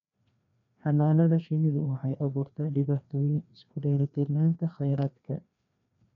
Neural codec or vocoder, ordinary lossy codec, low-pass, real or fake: codec, 16 kHz, 2 kbps, FreqCodec, larger model; none; 7.2 kHz; fake